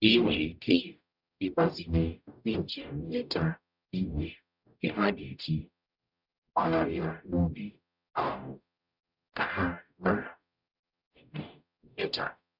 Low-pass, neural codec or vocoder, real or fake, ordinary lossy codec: 5.4 kHz; codec, 44.1 kHz, 0.9 kbps, DAC; fake; none